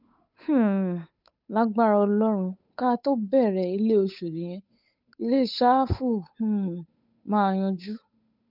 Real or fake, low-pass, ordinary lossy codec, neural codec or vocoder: fake; 5.4 kHz; none; codec, 16 kHz, 8 kbps, FunCodec, trained on Chinese and English, 25 frames a second